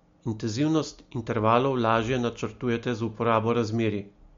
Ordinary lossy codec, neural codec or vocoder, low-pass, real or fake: MP3, 48 kbps; none; 7.2 kHz; real